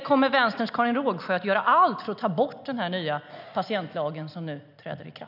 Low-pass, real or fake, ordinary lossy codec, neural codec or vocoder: 5.4 kHz; real; none; none